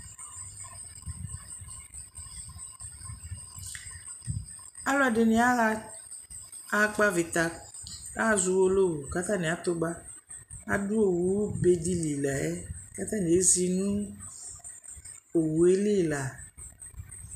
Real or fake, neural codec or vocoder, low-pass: real; none; 14.4 kHz